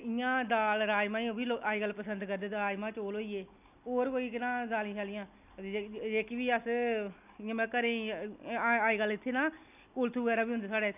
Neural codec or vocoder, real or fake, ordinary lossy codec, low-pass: none; real; none; 3.6 kHz